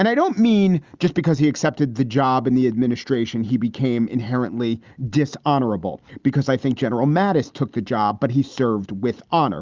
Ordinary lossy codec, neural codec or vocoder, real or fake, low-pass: Opus, 32 kbps; none; real; 7.2 kHz